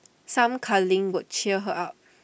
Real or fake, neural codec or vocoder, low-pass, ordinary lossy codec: real; none; none; none